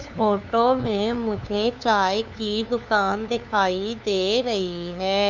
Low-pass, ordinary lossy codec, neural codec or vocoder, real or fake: 7.2 kHz; none; codec, 16 kHz, 2 kbps, FunCodec, trained on LibriTTS, 25 frames a second; fake